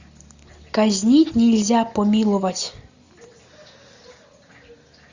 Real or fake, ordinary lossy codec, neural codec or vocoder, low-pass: real; Opus, 64 kbps; none; 7.2 kHz